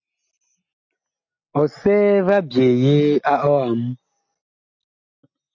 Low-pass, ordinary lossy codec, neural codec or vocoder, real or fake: 7.2 kHz; MP3, 48 kbps; none; real